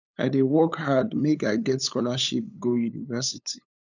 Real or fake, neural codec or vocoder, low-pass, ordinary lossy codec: fake; codec, 16 kHz, 8 kbps, FunCodec, trained on LibriTTS, 25 frames a second; 7.2 kHz; none